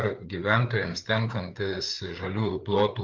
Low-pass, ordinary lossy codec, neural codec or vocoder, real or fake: 7.2 kHz; Opus, 24 kbps; codec, 16 kHz, 4 kbps, FreqCodec, larger model; fake